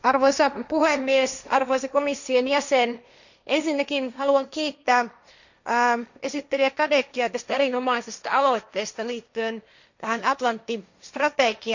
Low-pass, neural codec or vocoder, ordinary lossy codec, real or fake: 7.2 kHz; codec, 16 kHz, 1.1 kbps, Voila-Tokenizer; none; fake